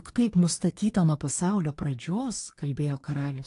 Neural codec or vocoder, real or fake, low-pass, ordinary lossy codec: codec, 24 kHz, 1 kbps, SNAC; fake; 10.8 kHz; AAC, 48 kbps